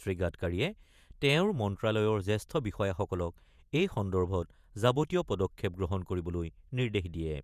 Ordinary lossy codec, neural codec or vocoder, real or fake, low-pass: none; vocoder, 48 kHz, 128 mel bands, Vocos; fake; 14.4 kHz